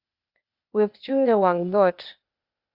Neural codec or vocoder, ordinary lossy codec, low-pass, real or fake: codec, 16 kHz, 0.8 kbps, ZipCodec; Opus, 64 kbps; 5.4 kHz; fake